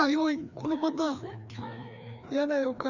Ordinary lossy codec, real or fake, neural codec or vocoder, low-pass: none; fake; codec, 16 kHz, 2 kbps, FreqCodec, larger model; 7.2 kHz